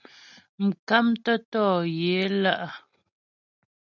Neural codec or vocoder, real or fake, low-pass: none; real; 7.2 kHz